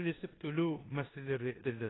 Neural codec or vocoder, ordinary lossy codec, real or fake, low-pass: codec, 16 kHz in and 24 kHz out, 0.9 kbps, LongCat-Audio-Codec, four codebook decoder; AAC, 16 kbps; fake; 7.2 kHz